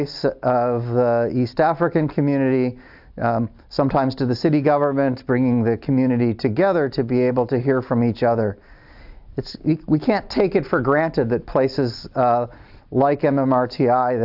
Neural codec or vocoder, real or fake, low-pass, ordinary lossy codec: none; real; 5.4 kHz; AAC, 48 kbps